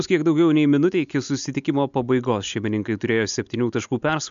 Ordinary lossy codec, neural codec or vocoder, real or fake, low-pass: MP3, 64 kbps; none; real; 7.2 kHz